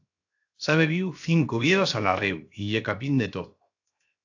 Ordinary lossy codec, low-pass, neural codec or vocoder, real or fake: AAC, 48 kbps; 7.2 kHz; codec, 16 kHz, 0.7 kbps, FocalCodec; fake